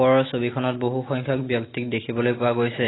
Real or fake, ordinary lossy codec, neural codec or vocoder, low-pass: real; AAC, 16 kbps; none; 7.2 kHz